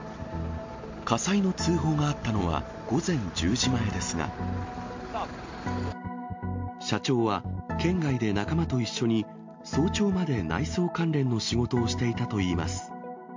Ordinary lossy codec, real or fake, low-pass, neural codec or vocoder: MP3, 48 kbps; real; 7.2 kHz; none